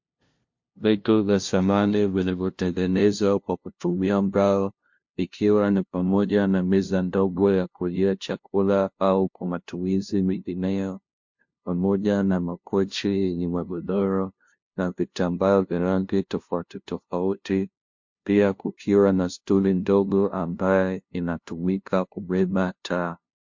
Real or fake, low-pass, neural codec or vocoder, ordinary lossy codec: fake; 7.2 kHz; codec, 16 kHz, 0.5 kbps, FunCodec, trained on LibriTTS, 25 frames a second; MP3, 48 kbps